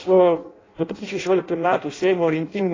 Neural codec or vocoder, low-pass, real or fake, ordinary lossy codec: codec, 16 kHz in and 24 kHz out, 0.6 kbps, FireRedTTS-2 codec; 7.2 kHz; fake; AAC, 32 kbps